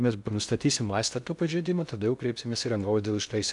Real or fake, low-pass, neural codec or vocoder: fake; 10.8 kHz; codec, 16 kHz in and 24 kHz out, 0.6 kbps, FocalCodec, streaming, 2048 codes